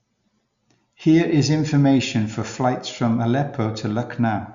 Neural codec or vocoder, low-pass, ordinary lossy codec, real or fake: none; 7.2 kHz; Opus, 64 kbps; real